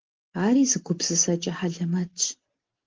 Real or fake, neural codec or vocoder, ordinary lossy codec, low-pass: real; none; Opus, 16 kbps; 7.2 kHz